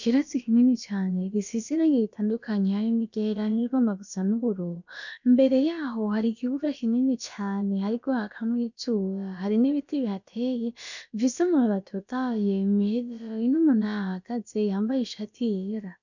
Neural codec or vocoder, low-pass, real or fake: codec, 16 kHz, about 1 kbps, DyCAST, with the encoder's durations; 7.2 kHz; fake